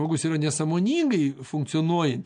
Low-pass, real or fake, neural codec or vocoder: 9.9 kHz; real; none